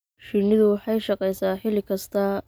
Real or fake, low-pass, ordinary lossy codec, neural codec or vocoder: real; none; none; none